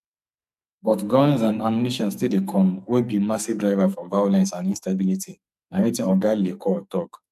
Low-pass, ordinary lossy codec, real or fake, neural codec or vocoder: 14.4 kHz; none; fake; codec, 44.1 kHz, 2.6 kbps, SNAC